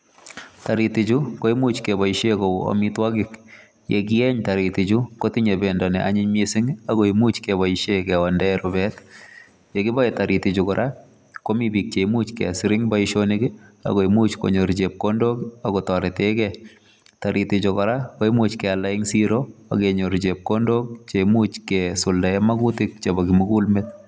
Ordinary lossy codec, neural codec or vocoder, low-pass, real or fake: none; none; none; real